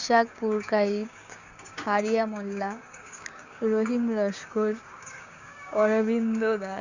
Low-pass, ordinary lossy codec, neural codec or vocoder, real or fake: 7.2 kHz; Opus, 64 kbps; codec, 16 kHz, 6 kbps, DAC; fake